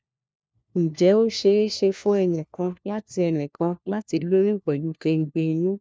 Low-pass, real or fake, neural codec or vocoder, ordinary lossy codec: none; fake; codec, 16 kHz, 1 kbps, FunCodec, trained on LibriTTS, 50 frames a second; none